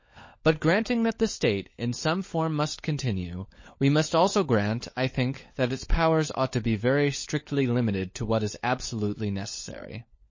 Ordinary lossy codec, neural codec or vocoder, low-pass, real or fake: MP3, 32 kbps; codec, 16 kHz, 16 kbps, FunCodec, trained on LibriTTS, 50 frames a second; 7.2 kHz; fake